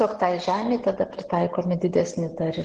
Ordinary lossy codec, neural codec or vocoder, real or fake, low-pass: Opus, 16 kbps; none; real; 10.8 kHz